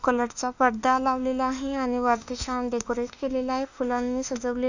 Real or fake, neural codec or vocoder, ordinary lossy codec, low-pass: fake; autoencoder, 48 kHz, 32 numbers a frame, DAC-VAE, trained on Japanese speech; none; 7.2 kHz